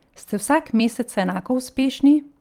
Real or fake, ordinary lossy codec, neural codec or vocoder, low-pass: fake; Opus, 32 kbps; vocoder, 44.1 kHz, 128 mel bands every 256 samples, BigVGAN v2; 19.8 kHz